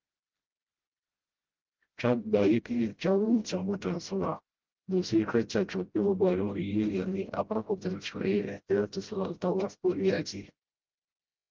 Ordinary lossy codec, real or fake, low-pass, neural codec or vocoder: Opus, 32 kbps; fake; 7.2 kHz; codec, 16 kHz, 0.5 kbps, FreqCodec, smaller model